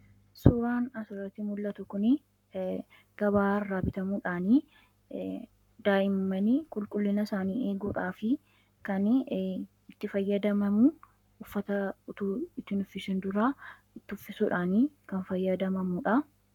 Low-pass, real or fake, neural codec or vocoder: 19.8 kHz; fake; codec, 44.1 kHz, 7.8 kbps, Pupu-Codec